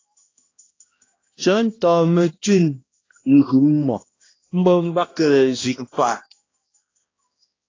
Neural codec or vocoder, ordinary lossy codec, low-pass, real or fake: codec, 16 kHz, 1 kbps, X-Codec, HuBERT features, trained on balanced general audio; AAC, 32 kbps; 7.2 kHz; fake